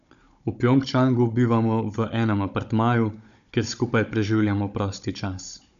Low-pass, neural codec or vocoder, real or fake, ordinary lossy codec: 7.2 kHz; codec, 16 kHz, 16 kbps, FunCodec, trained on Chinese and English, 50 frames a second; fake; none